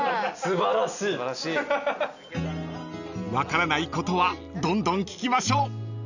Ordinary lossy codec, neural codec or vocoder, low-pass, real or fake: none; none; 7.2 kHz; real